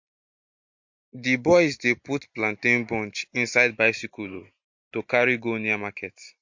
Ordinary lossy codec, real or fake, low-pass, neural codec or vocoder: MP3, 48 kbps; real; 7.2 kHz; none